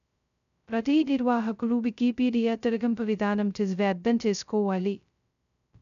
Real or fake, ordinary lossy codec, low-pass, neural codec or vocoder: fake; none; 7.2 kHz; codec, 16 kHz, 0.2 kbps, FocalCodec